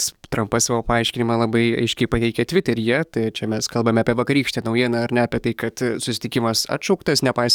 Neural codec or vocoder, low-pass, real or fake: codec, 44.1 kHz, 7.8 kbps, DAC; 19.8 kHz; fake